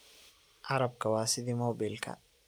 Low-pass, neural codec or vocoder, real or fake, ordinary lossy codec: none; none; real; none